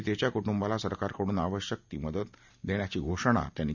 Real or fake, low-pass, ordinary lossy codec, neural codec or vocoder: real; 7.2 kHz; none; none